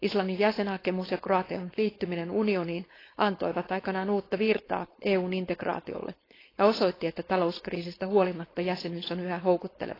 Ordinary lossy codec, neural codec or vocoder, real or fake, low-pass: AAC, 24 kbps; codec, 16 kHz, 4.8 kbps, FACodec; fake; 5.4 kHz